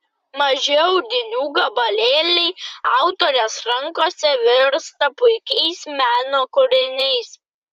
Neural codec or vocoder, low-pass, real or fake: vocoder, 44.1 kHz, 128 mel bands, Pupu-Vocoder; 14.4 kHz; fake